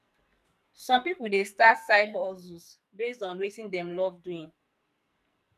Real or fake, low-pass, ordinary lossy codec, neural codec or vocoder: fake; 14.4 kHz; none; codec, 44.1 kHz, 2.6 kbps, SNAC